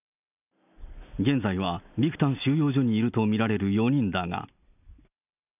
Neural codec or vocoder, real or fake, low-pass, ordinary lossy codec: none; real; 3.6 kHz; none